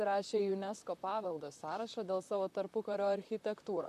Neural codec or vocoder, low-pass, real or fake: vocoder, 44.1 kHz, 128 mel bands, Pupu-Vocoder; 14.4 kHz; fake